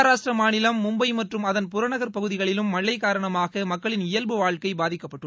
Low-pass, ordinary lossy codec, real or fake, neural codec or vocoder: none; none; real; none